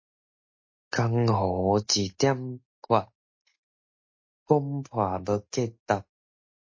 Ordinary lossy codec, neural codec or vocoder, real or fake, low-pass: MP3, 32 kbps; none; real; 7.2 kHz